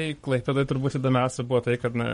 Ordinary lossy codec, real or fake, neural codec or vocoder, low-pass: MP3, 48 kbps; fake; codec, 44.1 kHz, 7.8 kbps, Pupu-Codec; 19.8 kHz